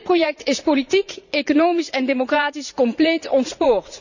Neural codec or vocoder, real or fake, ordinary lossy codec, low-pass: vocoder, 44.1 kHz, 80 mel bands, Vocos; fake; none; 7.2 kHz